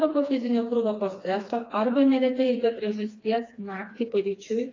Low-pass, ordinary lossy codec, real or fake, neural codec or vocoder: 7.2 kHz; AAC, 32 kbps; fake; codec, 16 kHz, 2 kbps, FreqCodec, smaller model